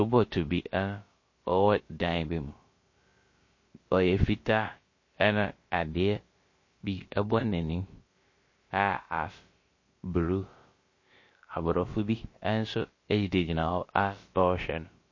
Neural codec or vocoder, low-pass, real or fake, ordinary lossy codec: codec, 16 kHz, about 1 kbps, DyCAST, with the encoder's durations; 7.2 kHz; fake; MP3, 32 kbps